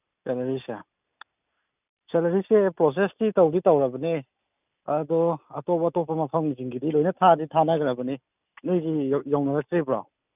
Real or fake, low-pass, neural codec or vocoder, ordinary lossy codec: real; 3.6 kHz; none; none